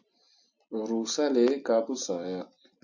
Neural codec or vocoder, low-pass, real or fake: vocoder, 44.1 kHz, 128 mel bands every 256 samples, BigVGAN v2; 7.2 kHz; fake